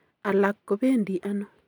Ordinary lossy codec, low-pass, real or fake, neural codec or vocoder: none; 19.8 kHz; real; none